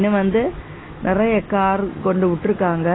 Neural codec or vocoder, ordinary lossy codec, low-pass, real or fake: none; AAC, 16 kbps; 7.2 kHz; real